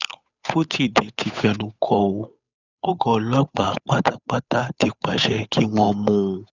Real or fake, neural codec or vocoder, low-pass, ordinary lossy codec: fake; codec, 16 kHz, 8 kbps, FunCodec, trained on Chinese and English, 25 frames a second; 7.2 kHz; none